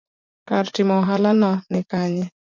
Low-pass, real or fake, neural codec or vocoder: 7.2 kHz; fake; vocoder, 44.1 kHz, 80 mel bands, Vocos